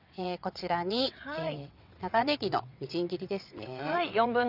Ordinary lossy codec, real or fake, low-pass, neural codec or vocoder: none; fake; 5.4 kHz; vocoder, 22.05 kHz, 80 mel bands, WaveNeXt